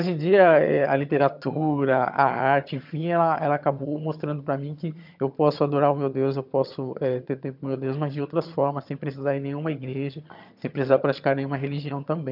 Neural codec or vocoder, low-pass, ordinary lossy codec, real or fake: vocoder, 22.05 kHz, 80 mel bands, HiFi-GAN; 5.4 kHz; none; fake